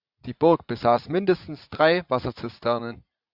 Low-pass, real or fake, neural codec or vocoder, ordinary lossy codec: 5.4 kHz; fake; vocoder, 44.1 kHz, 80 mel bands, Vocos; Opus, 64 kbps